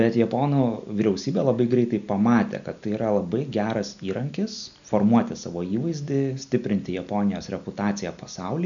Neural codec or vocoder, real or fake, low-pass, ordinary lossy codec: none; real; 7.2 kHz; MP3, 96 kbps